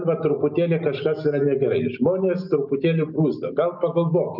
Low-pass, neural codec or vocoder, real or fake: 5.4 kHz; none; real